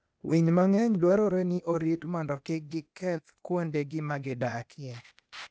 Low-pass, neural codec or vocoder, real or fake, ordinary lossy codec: none; codec, 16 kHz, 0.8 kbps, ZipCodec; fake; none